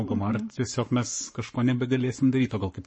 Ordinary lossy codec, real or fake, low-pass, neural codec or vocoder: MP3, 32 kbps; fake; 9.9 kHz; codec, 44.1 kHz, 7.8 kbps, Pupu-Codec